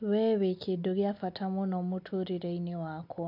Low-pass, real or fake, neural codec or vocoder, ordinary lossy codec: 5.4 kHz; real; none; none